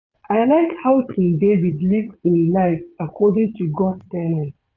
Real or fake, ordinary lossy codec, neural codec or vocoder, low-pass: fake; none; vocoder, 22.05 kHz, 80 mel bands, Vocos; 7.2 kHz